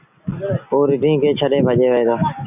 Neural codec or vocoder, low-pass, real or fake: none; 3.6 kHz; real